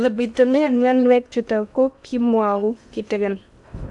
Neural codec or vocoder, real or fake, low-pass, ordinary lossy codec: codec, 16 kHz in and 24 kHz out, 0.6 kbps, FocalCodec, streaming, 2048 codes; fake; 10.8 kHz; none